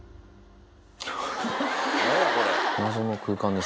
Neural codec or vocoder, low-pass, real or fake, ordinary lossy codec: none; none; real; none